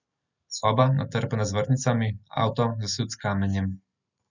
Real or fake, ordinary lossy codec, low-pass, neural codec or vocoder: real; none; 7.2 kHz; none